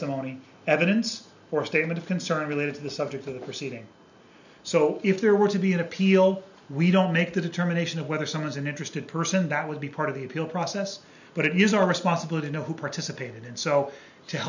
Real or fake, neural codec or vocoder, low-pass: real; none; 7.2 kHz